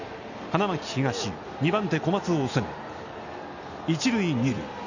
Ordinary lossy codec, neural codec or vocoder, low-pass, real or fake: none; none; 7.2 kHz; real